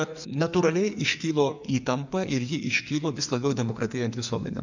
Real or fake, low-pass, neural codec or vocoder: fake; 7.2 kHz; codec, 44.1 kHz, 2.6 kbps, SNAC